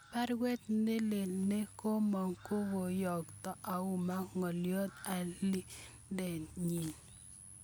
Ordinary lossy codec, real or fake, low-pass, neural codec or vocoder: none; real; none; none